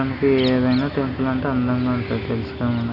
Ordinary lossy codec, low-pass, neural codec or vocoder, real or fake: none; 5.4 kHz; none; real